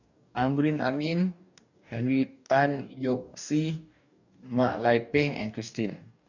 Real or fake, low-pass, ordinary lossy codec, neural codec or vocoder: fake; 7.2 kHz; none; codec, 44.1 kHz, 2.6 kbps, DAC